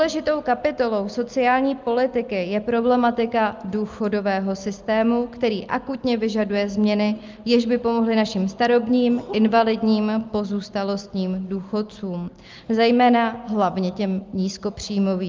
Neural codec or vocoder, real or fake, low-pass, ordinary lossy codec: none; real; 7.2 kHz; Opus, 24 kbps